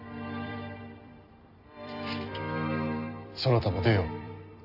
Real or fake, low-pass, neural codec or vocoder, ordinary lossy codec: fake; 5.4 kHz; vocoder, 44.1 kHz, 128 mel bands every 256 samples, BigVGAN v2; none